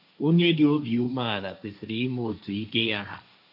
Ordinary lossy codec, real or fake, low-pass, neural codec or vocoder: none; fake; 5.4 kHz; codec, 16 kHz, 1.1 kbps, Voila-Tokenizer